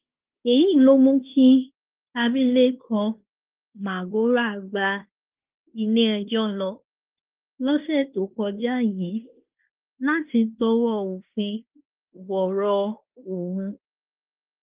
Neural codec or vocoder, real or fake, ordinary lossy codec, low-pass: codec, 16 kHz in and 24 kHz out, 0.9 kbps, LongCat-Audio-Codec, fine tuned four codebook decoder; fake; Opus, 24 kbps; 3.6 kHz